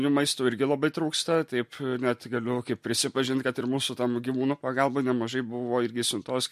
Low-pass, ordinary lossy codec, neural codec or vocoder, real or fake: 14.4 kHz; MP3, 64 kbps; none; real